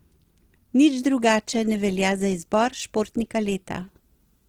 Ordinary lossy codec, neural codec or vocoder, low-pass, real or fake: Opus, 16 kbps; none; 19.8 kHz; real